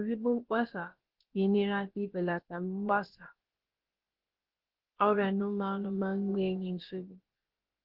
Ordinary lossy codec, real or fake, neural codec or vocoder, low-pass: Opus, 16 kbps; fake; codec, 16 kHz, about 1 kbps, DyCAST, with the encoder's durations; 5.4 kHz